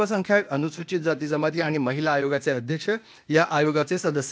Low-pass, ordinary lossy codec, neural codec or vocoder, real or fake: none; none; codec, 16 kHz, 0.8 kbps, ZipCodec; fake